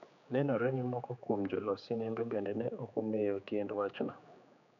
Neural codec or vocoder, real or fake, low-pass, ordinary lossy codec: codec, 16 kHz, 4 kbps, X-Codec, HuBERT features, trained on general audio; fake; 7.2 kHz; none